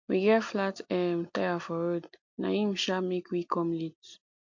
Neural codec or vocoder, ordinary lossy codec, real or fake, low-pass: none; MP3, 48 kbps; real; 7.2 kHz